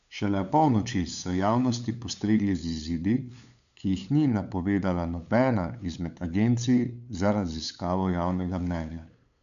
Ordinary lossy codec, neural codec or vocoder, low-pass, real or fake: none; codec, 16 kHz, 8 kbps, FunCodec, trained on LibriTTS, 25 frames a second; 7.2 kHz; fake